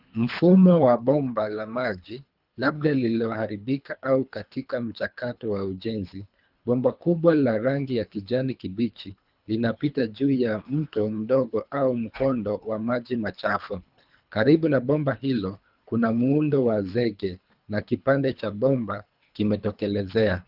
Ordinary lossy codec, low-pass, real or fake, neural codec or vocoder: Opus, 24 kbps; 5.4 kHz; fake; codec, 24 kHz, 3 kbps, HILCodec